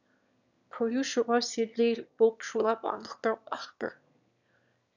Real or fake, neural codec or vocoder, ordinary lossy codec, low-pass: fake; autoencoder, 22.05 kHz, a latent of 192 numbers a frame, VITS, trained on one speaker; none; 7.2 kHz